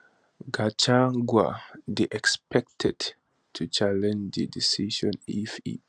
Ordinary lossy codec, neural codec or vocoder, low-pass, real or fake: Opus, 64 kbps; none; 9.9 kHz; real